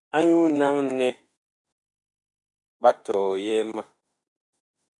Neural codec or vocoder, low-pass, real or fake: autoencoder, 48 kHz, 32 numbers a frame, DAC-VAE, trained on Japanese speech; 10.8 kHz; fake